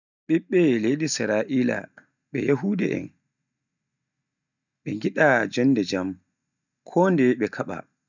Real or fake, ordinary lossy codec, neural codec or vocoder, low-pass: real; none; none; 7.2 kHz